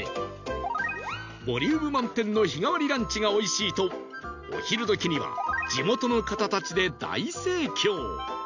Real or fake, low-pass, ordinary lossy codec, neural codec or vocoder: real; 7.2 kHz; none; none